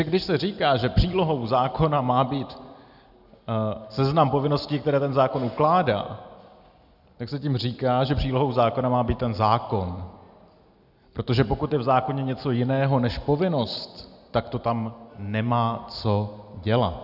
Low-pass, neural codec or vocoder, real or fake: 5.4 kHz; none; real